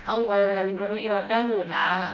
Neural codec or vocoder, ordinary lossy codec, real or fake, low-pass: codec, 16 kHz, 0.5 kbps, FreqCodec, smaller model; none; fake; 7.2 kHz